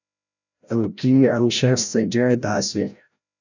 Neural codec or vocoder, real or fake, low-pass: codec, 16 kHz, 0.5 kbps, FreqCodec, larger model; fake; 7.2 kHz